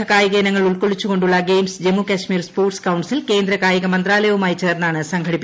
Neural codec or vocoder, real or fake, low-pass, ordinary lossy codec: none; real; none; none